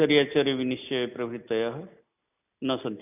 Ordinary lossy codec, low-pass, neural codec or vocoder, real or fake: none; 3.6 kHz; none; real